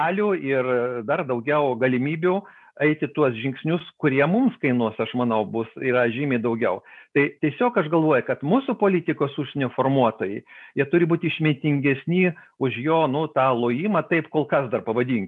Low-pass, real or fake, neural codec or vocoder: 10.8 kHz; real; none